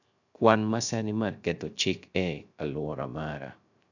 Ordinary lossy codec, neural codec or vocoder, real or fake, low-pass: none; codec, 16 kHz, 0.3 kbps, FocalCodec; fake; 7.2 kHz